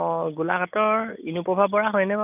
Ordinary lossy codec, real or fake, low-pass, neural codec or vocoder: none; real; 3.6 kHz; none